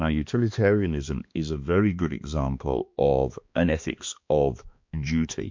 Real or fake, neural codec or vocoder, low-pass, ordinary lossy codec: fake; codec, 16 kHz, 2 kbps, X-Codec, HuBERT features, trained on balanced general audio; 7.2 kHz; MP3, 48 kbps